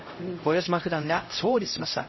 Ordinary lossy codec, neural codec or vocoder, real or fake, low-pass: MP3, 24 kbps; codec, 16 kHz, 0.5 kbps, X-Codec, HuBERT features, trained on LibriSpeech; fake; 7.2 kHz